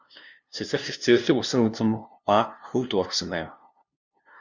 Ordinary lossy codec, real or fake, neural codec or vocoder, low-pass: Opus, 64 kbps; fake; codec, 16 kHz, 0.5 kbps, FunCodec, trained on LibriTTS, 25 frames a second; 7.2 kHz